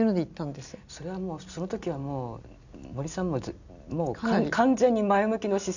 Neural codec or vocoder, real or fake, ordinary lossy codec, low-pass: none; real; none; 7.2 kHz